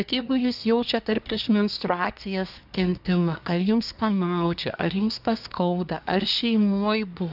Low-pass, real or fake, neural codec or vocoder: 5.4 kHz; fake; codec, 24 kHz, 1 kbps, SNAC